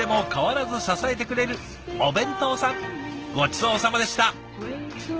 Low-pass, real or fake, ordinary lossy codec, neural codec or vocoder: 7.2 kHz; real; Opus, 16 kbps; none